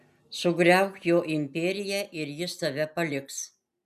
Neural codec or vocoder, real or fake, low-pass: none; real; 14.4 kHz